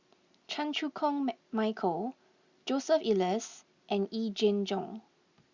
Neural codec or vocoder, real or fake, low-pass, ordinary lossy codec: none; real; 7.2 kHz; Opus, 64 kbps